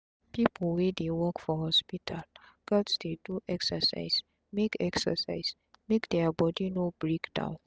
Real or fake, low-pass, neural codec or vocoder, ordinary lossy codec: real; none; none; none